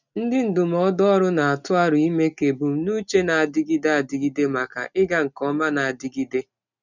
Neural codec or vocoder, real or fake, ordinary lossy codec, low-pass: none; real; none; 7.2 kHz